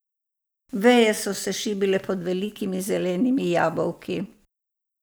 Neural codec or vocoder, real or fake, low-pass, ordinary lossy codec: none; real; none; none